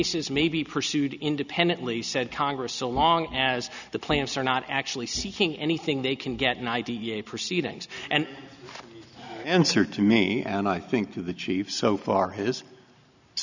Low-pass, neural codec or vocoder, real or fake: 7.2 kHz; none; real